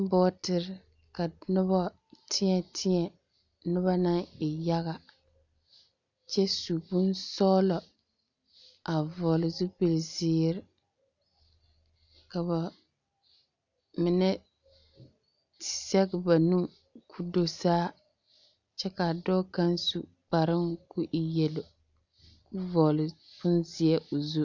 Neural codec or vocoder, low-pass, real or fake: none; 7.2 kHz; real